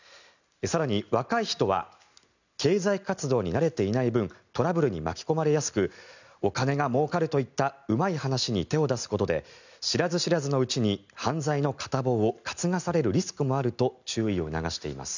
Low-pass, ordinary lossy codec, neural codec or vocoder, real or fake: 7.2 kHz; none; none; real